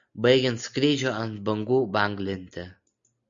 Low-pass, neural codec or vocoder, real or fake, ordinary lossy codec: 7.2 kHz; none; real; MP3, 96 kbps